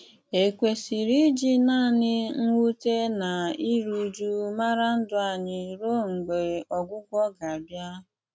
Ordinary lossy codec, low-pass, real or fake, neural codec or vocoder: none; none; real; none